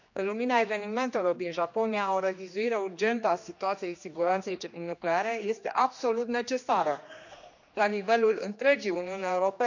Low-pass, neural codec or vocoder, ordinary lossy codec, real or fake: 7.2 kHz; codec, 16 kHz, 2 kbps, X-Codec, HuBERT features, trained on general audio; none; fake